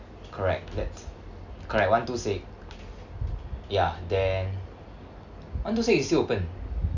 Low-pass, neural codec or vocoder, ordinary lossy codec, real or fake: 7.2 kHz; none; none; real